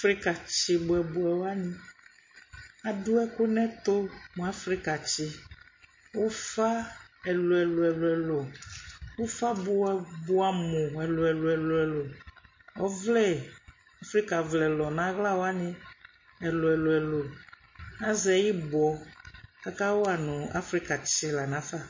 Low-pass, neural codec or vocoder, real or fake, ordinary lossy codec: 7.2 kHz; none; real; MP3, 32 kbps